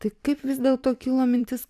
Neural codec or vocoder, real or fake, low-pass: vocoder, 44.1 kHz, 128 mel bands every 512 samples, BigVGAN v2; fake; 14.4 kHz